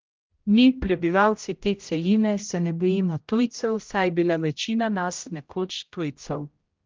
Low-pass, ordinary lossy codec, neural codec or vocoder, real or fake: 7.2 kHz; Opus, 24 kbps; codec, 16 kHz, 0.5 kbps, X-Codec, HuBERT features, trained on general audio; fake